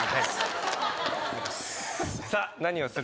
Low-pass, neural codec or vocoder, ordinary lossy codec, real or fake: none; none; none; real